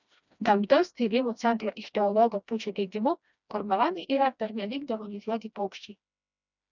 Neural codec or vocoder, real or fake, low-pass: codec, 16 kHz, 1 kbps, FreqCodec, smaller model; fake; 7.2 kHz